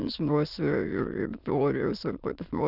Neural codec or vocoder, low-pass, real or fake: autoencoder, 22.05 kHz, a latent of 192 numbers a frame, VITS, trained on many speakers; 5.4 kHz; fake